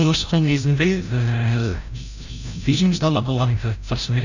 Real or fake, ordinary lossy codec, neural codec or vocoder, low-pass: fake; none; codec, 16 kHz, 0.5 kbps, FreqCodec, larger model; 7.2 kHz